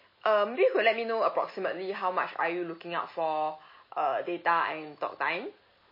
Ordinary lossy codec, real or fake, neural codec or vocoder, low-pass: MP3, 24 kbps; real; none; 5.4 kHz